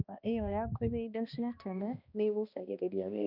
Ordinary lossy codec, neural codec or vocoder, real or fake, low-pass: none; codec, 16 kHz, 1 kbps, X-Codec, HuBERT features, trained on balanced general audio; fake; 5.4 kHz